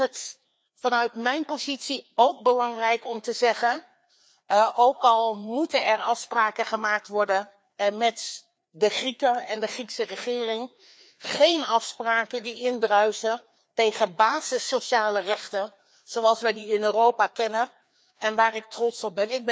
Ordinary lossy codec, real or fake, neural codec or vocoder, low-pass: none; fake; codec, 16 kHz, 2 kbps, FreqCodec, larger model; none